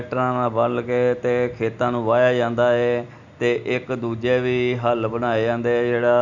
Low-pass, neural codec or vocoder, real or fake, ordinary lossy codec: 7.2 kHz; none; real; none